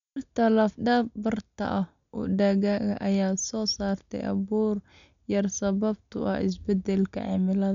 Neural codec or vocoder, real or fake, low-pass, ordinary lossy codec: none; real; 7.2 kHz; none